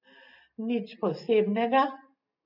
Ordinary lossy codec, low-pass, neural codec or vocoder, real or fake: none; 5.4 kHz; none; real